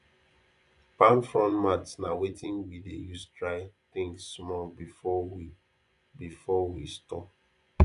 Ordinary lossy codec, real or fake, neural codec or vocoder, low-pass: none; fake; vocoder, 24 kHz, 100 mel bands, Vocos; 10.8 kHz